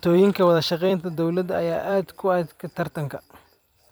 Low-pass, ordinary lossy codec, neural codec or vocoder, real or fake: none; none; vocoder, 44.1 kHz, 128 mel bands every 256 samples, BigVGAN v2; fake